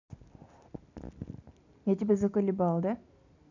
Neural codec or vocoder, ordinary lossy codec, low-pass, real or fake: none; none; 7.2 kHz; real